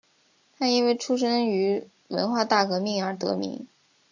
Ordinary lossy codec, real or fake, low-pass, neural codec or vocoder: AAC, 48 kbps; real; 7.2 kHz; none